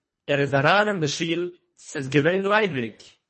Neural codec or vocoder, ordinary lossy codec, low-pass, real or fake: codec, 24 kHz, 1.5 kbps, HILCodec; MP3, 32 kbps; 10.8 kHz; fake